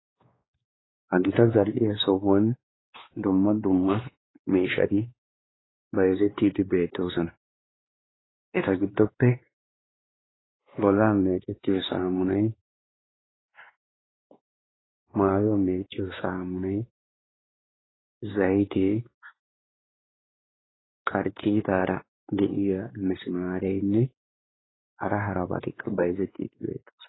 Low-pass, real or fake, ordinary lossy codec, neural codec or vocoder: 7.2 kHz; fake; AAC, 16 kbps; codec, 16 kHz, 2 kbps, X-Codec, WavLM features, trained on Multilingual LibriSpeech